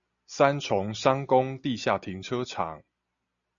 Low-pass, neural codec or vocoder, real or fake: 7.2 kHz; none; real